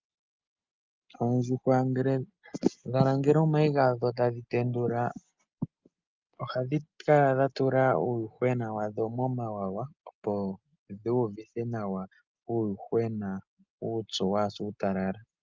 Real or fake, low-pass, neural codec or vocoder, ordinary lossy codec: real; 7.2 kHz; none; Opus, 24 kbps